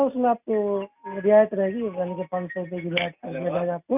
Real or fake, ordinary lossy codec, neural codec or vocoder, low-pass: real; none; none; 3.6 kHz